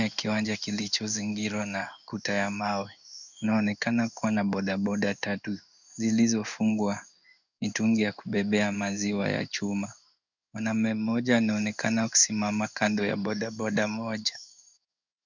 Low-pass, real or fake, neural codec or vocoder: 7.2 kHz; fake; codec, 16 kHz in and 24 kHz out, 1 kbps, XY-Tokenizer